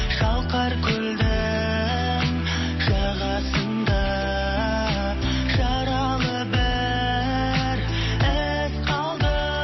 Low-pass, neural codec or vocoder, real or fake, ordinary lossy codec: 7.2 kHz; none; real; MP3, 24 kbps